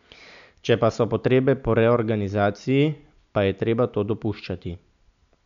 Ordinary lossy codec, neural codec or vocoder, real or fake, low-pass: none; none; real; 7.2 kHz